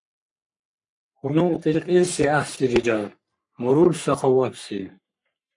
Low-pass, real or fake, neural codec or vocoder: 10.8 kHz; fake; codec, 44.1 kHz, 3.4 kbps, Pupu-Codec